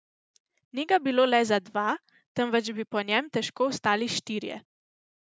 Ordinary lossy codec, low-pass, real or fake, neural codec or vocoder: none; none; real; none